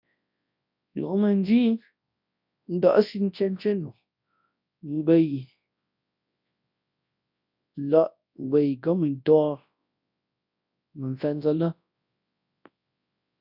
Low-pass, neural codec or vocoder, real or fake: 5.4 kHz; codec, 24 kHz, 0.9 kbps, WavTokenizer, large speech release; fake